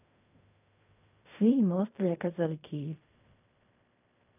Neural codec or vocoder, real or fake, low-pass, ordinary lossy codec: codec, 16 kHz in and 24 kHz out, 0.4 kbps, LongCat-Audio-Codec, fine tuned four codebook decoder; fake; 3.6 kHz; none